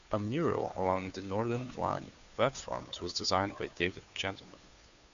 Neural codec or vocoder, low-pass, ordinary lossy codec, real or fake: codec, 16 kHz, 2 kbps, FunCodec, trained on Chinese and English, 25 frames a second; 7.2 kHz; AAC, 96 kbps; fake